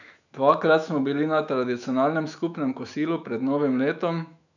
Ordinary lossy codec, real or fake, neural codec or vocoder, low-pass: none; fake; autoencoder, 48 kHz, 128 numbers a frame, DAC-VAE, trained on Japanese speech; 7.2 kHz